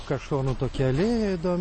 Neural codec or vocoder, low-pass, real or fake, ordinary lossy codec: none; 10.8 kHz; real; MP3, 32 kbps